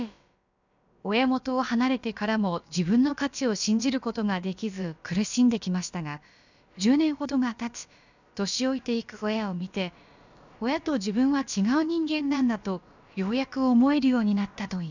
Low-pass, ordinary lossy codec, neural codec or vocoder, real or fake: 7.2 kHz; none; codec, 16 kHz, about 1 kbps, DyCAST, with the encoder's durations; fake